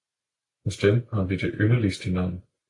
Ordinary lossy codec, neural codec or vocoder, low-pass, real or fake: AAC, 48 kbps; none; 10.8 kHz; real